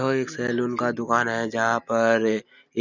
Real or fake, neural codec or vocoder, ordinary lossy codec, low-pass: real; none; none; 7.2 kHz